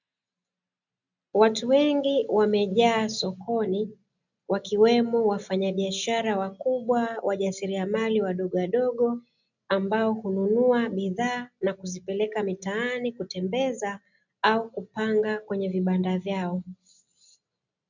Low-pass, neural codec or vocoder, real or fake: 7.2 kHz; none; real